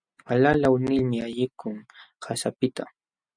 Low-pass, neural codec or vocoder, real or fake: 9.9 kHz; none; real